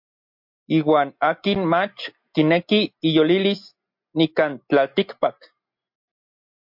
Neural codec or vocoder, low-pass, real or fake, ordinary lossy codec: none; 5.4 kHz; real; MP3, 48 kbps